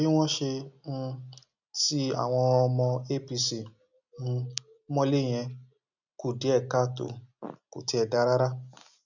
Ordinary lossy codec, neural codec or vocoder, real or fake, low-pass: none; none; real; 7.2 kHz